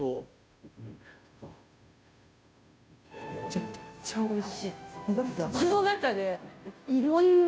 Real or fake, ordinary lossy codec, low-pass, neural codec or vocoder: fake; none; none; codec, 16 kHz, 0.5 kbps, FunCodec, trained on Chinese and English, 25 frames a second